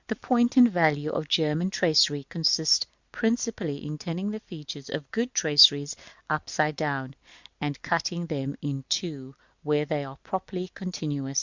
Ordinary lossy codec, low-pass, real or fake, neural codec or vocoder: Opus, 64 kbps; 7.2 kHz; real; none